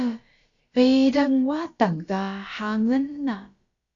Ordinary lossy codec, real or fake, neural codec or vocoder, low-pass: Opus, 64 kbps; fake; codec, 16 kHz, about 1 kbps, DyCAST, with the encoder's durations; 7.2 kHz